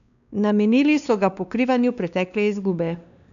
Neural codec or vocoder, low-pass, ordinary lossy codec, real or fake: codec, 16 kHz, 2 kbps, X-Codec, WavLM features, trained on Multilingual LibriSpeech; 7.2 kHz; none; fake